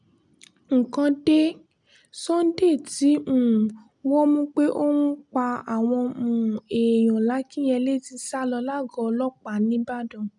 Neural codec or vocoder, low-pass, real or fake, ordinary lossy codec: none; 10.8 kHz; real; none